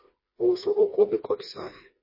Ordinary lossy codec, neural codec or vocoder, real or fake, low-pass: MP3, 24 kbps; codec, 16 kHz, 2 kbps, FreqCodec, smaller model; fake; 5.4 kHz